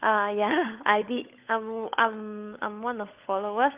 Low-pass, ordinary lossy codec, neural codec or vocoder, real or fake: 3.6 kHz; Opus, 32 kbps; codec, 16 kHz, 16 kbps, FunCodec, trained on LibriTTS, 50 frames a second; fake